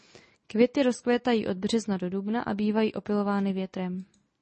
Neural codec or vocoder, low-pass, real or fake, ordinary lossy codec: none; 10.8 kHz; real; MP3, 32 kbps